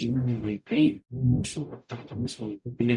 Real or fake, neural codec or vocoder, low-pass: fake; codec, 44.1 kHz, 0.9 kbps, DAC; 10.8 kHz